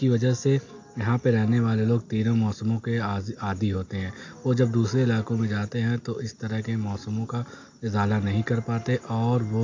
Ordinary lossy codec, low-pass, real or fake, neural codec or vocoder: AAC, 48 kbps; 7.2 kHz; real; none